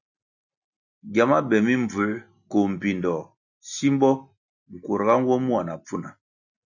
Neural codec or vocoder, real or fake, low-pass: none; real; 7.2 kHz